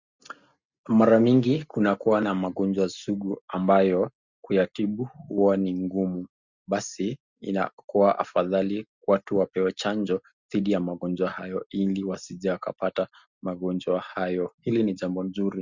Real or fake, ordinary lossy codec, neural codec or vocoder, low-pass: real; Opus, 64 kbps; none; 7.2 kHz